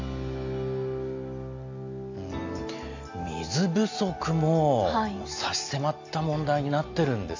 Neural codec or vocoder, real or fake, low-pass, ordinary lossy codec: none; real; 7.2 kHz; AAC, 48 kbps